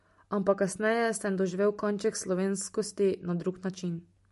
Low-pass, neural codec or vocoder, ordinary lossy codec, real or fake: 14.4 kHz; none; MP3, 48 kbps; real